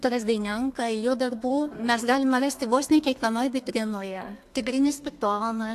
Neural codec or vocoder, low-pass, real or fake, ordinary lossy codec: codec, 32 kHz, 1.9 kbps, SNAC; 14.4 kHz; fake; AAC, 64 kbps